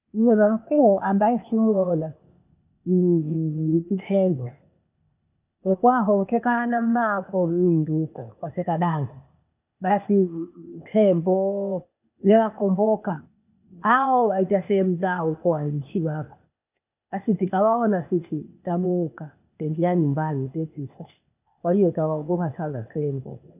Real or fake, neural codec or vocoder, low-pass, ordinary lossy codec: fake; codec, 16 kHz, 0.8 kbps, ZipCodec; 3.6 kHz; none